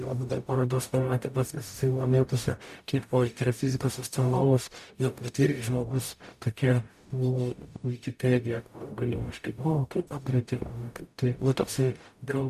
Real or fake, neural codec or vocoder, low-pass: fake; codec, 44.1 kHz, 0.9 kbps, DAC; 14.4 kHz